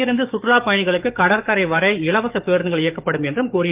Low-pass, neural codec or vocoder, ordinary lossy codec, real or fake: 3.6 kHz; codec, 44.1 kHz, 7.8 kbps, DAC; Opus, 24 kbps; fake